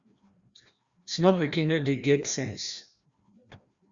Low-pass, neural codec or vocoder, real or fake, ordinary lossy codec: 7.2 kHz; codec, 16 kHz, 1 kbps, FreqCodec, larger model; fake; Opus, 64 kbps